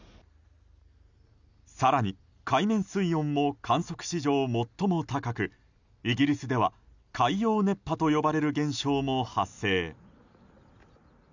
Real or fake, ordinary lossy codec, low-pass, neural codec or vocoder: real; none; 7.2 kHz; none